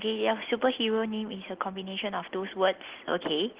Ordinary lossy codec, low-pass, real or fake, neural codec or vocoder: Opus, 16 kbps; 3.6 kHz; real; none